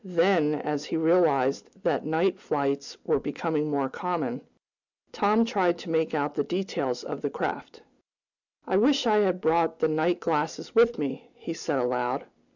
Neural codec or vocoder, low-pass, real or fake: none; 7.2 kHz; real